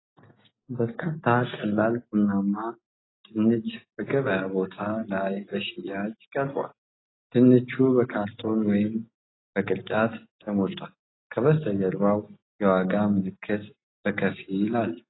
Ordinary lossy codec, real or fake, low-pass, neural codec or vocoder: AAC, 16 kbps; real; 7.2 kHz; none